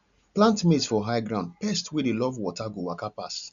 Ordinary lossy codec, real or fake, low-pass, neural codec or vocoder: none; real; 7.2 kHz; none